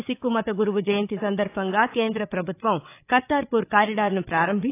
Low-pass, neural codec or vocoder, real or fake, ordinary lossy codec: 3.6 kHz; codec, 16 kHz, 8 kbps, FreqCodec, larger model; fake; AAC, 24 kbps